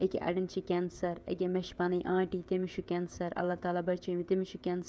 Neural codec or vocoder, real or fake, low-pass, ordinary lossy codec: codec, 16 kHz, 16 kbps, FreqCodec, smaller model; fake; none; none